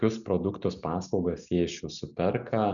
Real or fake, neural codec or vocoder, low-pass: real; none; 7.2 kHz